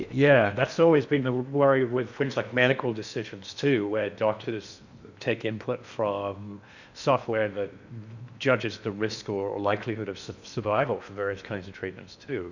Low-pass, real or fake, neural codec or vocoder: 7.2 kHz; fake; codec, 16 kHz in and 24 kHz out, 0.8 kbps, FocalCodec, streaming, 65536 codes